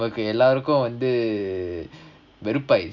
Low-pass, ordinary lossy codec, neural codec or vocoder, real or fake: 7.2 kHz; none; none; real